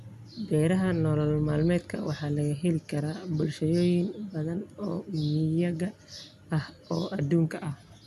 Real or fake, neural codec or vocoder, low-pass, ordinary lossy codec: real; none; 14.4 kHz; none